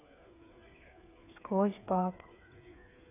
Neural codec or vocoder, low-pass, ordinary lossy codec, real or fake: codec, 16 kHz, 8 kbps, FreqCodec, smaller model; 3.6 kHz; none; fake